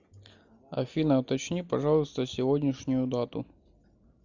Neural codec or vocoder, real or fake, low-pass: none; real; 7.2 kHz